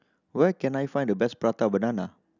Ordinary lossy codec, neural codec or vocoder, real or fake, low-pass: none; none; real; 7.2 kHz